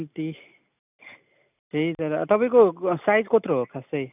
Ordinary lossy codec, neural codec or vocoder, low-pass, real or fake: none; none; 3.6 kHz; real